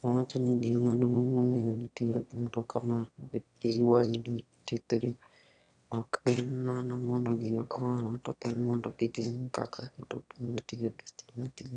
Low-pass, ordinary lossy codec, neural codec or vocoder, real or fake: 9.9 kHz; none; autoencoder, 22.05 kHz, a latent of 192 numbers a frame, VITS, trained on one speaker; fake